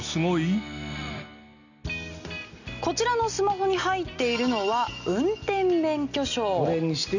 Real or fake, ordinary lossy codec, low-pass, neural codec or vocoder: real; none; 7.2 kHz; none